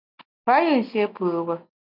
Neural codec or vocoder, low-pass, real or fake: none; 5.4 kHz; real